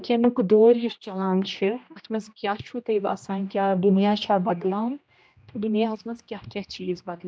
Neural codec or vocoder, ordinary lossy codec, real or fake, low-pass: codec, 16 kHz, 1 kbps, X-Codec, HuBERT features, trained on general audio; none; fake; none